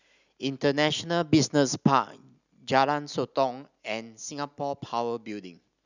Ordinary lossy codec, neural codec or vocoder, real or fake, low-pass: none; none; real; 7.2 kHz